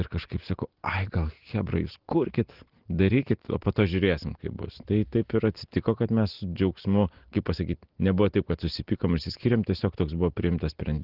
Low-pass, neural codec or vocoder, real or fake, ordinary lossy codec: 5.4 kHz; none; real; Opus, 16 kbps